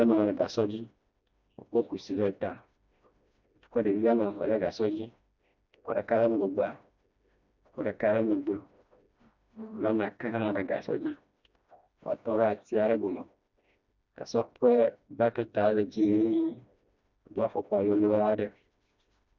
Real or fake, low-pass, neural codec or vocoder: fake; 7.2 kHz; codec, 16 kHz, 1 kbps, FreqCodec, smaller model